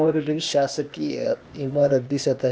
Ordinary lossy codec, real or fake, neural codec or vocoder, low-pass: none; fake; codec, 16 kHz, 0.8 kbps, ZipCodec; none